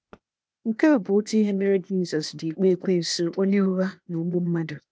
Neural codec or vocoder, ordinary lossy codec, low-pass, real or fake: codec, 16 kHz, 0.8 kbps, ZipCodec; none; none; fake